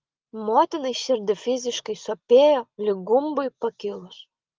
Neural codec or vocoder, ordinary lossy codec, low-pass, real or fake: none; Opus, 32 kbps; 7.2 kHz; real